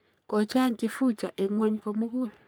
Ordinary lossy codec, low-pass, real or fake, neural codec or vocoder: none; none; fake; codec, 44.1 kHz, 3.4 kbps, Pupu-Codec